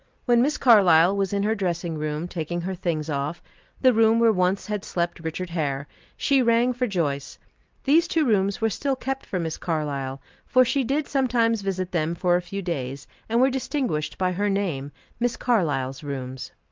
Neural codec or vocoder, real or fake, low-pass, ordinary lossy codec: none; real; 7.2 kHz; Opus, 32 kbps